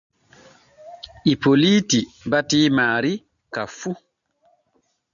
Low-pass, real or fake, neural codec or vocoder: 7.2 kHz; real; none